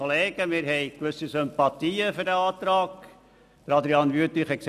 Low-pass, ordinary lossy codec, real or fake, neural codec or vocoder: 14.4 kHz; none; real; none